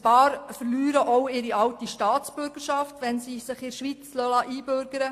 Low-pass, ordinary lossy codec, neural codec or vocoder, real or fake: 14.4 kHz; AAC, 64 kbps; none; real